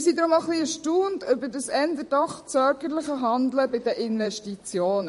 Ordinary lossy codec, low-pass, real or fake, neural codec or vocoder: MP3, 48 kbps; 14.4 kHz; fake; vocoder, 44.1 kHz, 128 mel bands, Pupu-Vocoder